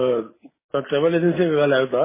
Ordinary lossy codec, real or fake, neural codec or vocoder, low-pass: MP3, 16 kbps; real; none; 3.6 kHz